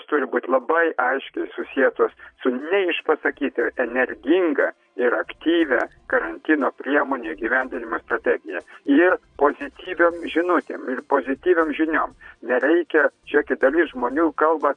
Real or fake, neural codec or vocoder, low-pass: fake; vocoder, 22.05 kHz, 80 mel bands, Vocos; 9.9 kHz